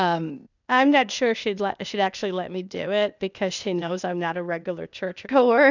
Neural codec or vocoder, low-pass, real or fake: codec, 16 kHz, 0.8 kbps, ZipCodec; 7.2 kHz; fake